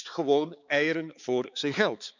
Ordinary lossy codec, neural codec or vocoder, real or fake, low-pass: none; codec, 16 kHz, 4 kbps, X-Codec, HuBERT features, trained on balanced general audio; fake; 7.2 kHz